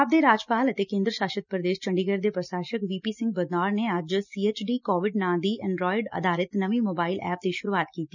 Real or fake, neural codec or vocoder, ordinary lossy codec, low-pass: real; none; none; 7.2 kHz